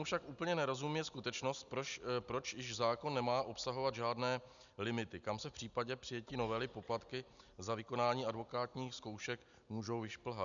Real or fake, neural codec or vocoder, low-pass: real; none; 7.2 kHz